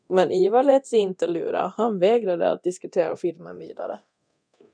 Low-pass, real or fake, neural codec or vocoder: 9.9 kHz; fake; codec, 24 kHz, 0.9 kbps, DualCodec